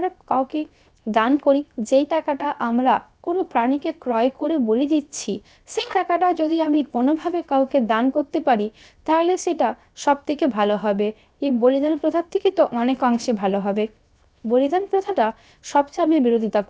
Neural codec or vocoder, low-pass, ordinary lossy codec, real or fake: codec, 16 kHz, 0.7 kbps, FocalCodec; none; none; fake